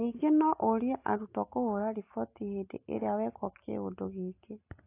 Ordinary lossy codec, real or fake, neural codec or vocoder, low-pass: AAC, 24 kbps; real; none; 3.6 kHz